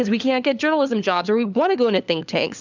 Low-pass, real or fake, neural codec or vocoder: 7.2 kHz; fake; codec, 16 kHz, 4 kbps, FunCodec, trained on LibriTTS, 50 frames a second